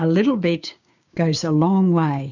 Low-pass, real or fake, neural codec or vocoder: 7.2 kHz; fake; codec, 16 kHz, 6 kbps, DAC